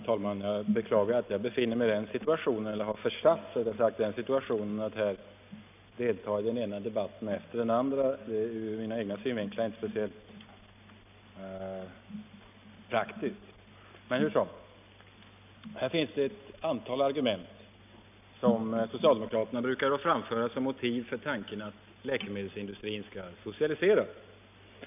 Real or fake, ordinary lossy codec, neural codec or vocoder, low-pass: real; none; none; 3.6 kHz